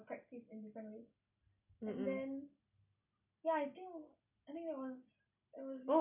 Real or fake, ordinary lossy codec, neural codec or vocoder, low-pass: real; none; none; 3.6 kHz